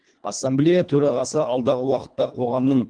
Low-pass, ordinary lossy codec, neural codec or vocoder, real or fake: 9.9 kHz; Opus, 24 kbps; codec, 24 kHz, 1.5 kbps, HILCodec; fake